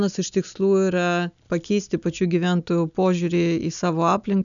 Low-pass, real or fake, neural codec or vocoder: 7.2 kHz; real; none